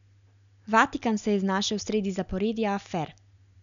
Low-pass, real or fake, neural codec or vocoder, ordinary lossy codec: 7.2 kHz; real; none; none